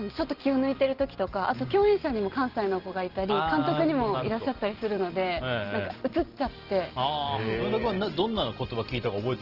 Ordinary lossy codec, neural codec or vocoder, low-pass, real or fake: Opus, 16 kbps; none; 5.4 kHz; real